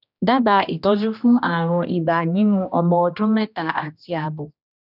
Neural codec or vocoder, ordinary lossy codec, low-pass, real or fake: codec, 16 kHz, 1 kbps, X-Codec, HuBERT features, trained on general audio; none; 5.4 kHz; fake